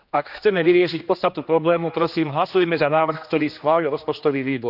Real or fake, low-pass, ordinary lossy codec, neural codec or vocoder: fake; 5.4 kHz; none; codec, 16 kHz, 2 kbps, X-Codec, HuBERT features, trained on general audio